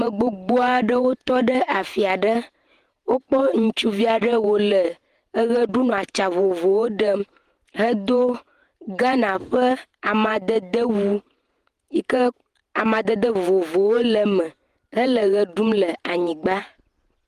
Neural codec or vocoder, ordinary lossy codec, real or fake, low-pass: vocoder, 48 kHz, 128 mel bands, Vocos; Opus, 24 kbps; fake; 14.4 kHz